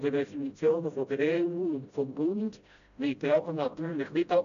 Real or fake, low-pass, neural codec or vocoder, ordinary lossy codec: fake; 7.2 kHz; codec, 16 kHz, 0.5 kbps, FreqCodec, smaller model; none